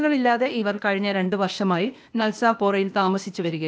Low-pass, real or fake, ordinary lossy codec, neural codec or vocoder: none; fake; none; codec, 16 kHz, 0.8 kbps, ZipCodec